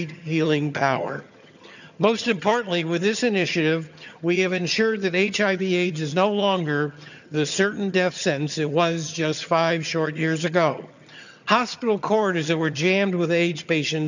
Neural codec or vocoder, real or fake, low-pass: vocoder, 22.05 kHz, 80 mel bands, HiFi-GAN; fake; 7.2 kHz